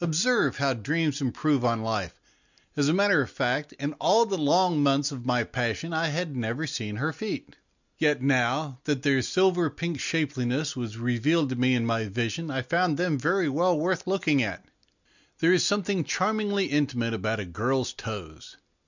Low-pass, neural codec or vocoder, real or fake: 7.2 kHz; none; real